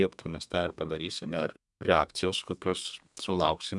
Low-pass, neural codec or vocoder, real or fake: 10.8 kHz; codec, 32 kHz, 1.9 kbps, SNAC; fake